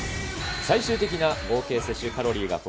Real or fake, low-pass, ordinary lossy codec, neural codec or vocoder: real; none; none; none